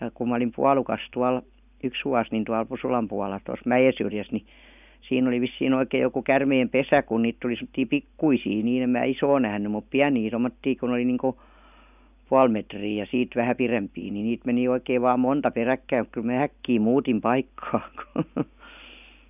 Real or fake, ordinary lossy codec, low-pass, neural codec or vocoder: real; none; 3.6 kHz; none